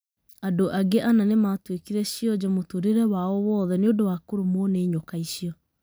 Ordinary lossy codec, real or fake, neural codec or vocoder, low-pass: none; real; none; none